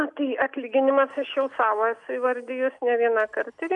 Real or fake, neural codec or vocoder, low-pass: real; none; 10.8 kHz